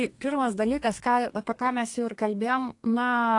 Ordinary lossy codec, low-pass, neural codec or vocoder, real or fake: AAC, 48 kbps; 10.8 kHz; codec, 32 kHz, 1.9 kbps, SNAC; fake